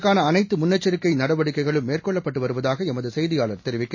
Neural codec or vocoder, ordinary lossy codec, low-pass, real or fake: none; MP3, 32 kbps; 7.2 kHz; real